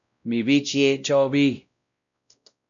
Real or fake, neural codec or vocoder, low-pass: fake; codec, 16 kHz, 0.5 kbps, X-Codec, WavLM features, trained on Multilingual LibriSpeech; 7.2 kHz